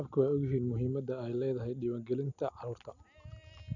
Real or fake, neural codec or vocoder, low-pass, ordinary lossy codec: real; none; 7.2 kHz; none